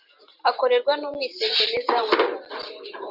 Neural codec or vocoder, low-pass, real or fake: vocoder, 44.1 kHz, 128 mel bands every 512 samples, BigVGAN v2; 5.4 kHz; fake